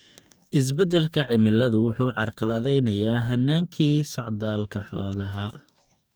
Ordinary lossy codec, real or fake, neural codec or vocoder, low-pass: none; fake; codec, 44.1 kHz, 2.6 kbps, DAC; none